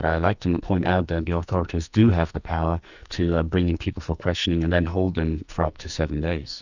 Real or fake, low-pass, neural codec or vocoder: fake; 7.2 kHz; codec, 44.1 kHz, 2.6 kbps, SNAC